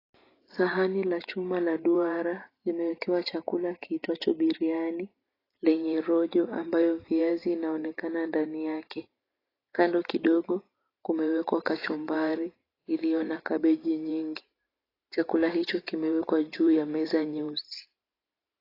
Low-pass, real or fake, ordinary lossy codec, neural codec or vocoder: 5.4 kHz; fake; AAC, 24 kbps; vocoder, 44.1 kHz, 128 mel bands every 512 samples, BigVGAN v2